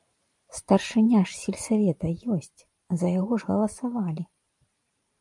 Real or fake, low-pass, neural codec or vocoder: fake; 10.8 kHz; vocoder, 44.1 kHz, 128 mel bands every 512 samples, BigVGAN v2